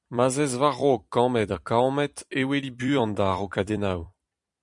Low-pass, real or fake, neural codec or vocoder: 10.8 kHz; fake; vocoder, 44.1 kHz, 128 mel bands every 256 samples, BigVGAN v2